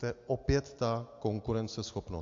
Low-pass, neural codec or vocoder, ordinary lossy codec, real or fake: 7.2 kHz; none; AAC, 48 kbps; real